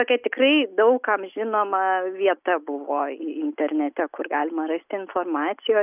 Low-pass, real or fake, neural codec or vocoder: 3.6 kHz; real; none